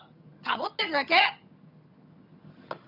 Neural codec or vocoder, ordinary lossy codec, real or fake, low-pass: vocoder, 22.05 kHz, 80 mel bands, HiFi-GAN; none; fake; 5.4 kHz